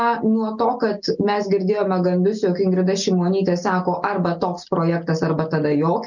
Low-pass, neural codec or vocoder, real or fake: 7.2 kHz; none; real